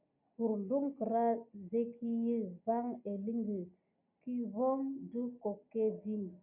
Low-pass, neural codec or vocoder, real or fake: 3.6 kHz; none; real